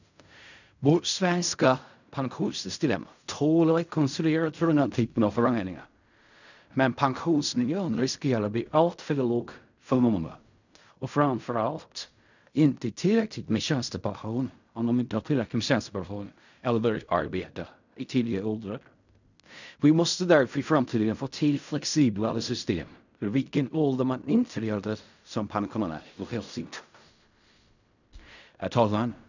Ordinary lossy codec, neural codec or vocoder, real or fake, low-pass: none; codec, 16 kHz in and 24 kHz out, 0.4 kbps, LongCat-Audio-Codec, fine tuned four codebook decoder; fake; 7.2 kHz